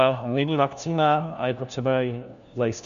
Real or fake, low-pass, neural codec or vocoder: fake; 7.2 kHz; codec, 16 kHz, 1 kbps, FunCodec, trained on LibriTTS, 50 frames a second